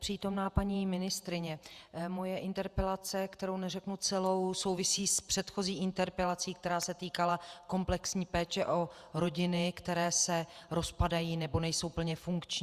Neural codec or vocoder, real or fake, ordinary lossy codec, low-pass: vocoder, 48 kHz, 128 mel bands, Vocos; fake; Opus, 64 kbps; 14.4 kHz